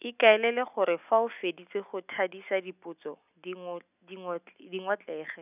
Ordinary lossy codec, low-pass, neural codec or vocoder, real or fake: none; 3.6 kHz; none; real